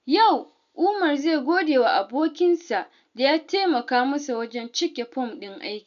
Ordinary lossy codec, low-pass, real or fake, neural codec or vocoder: none; 7.2 kHz; real; none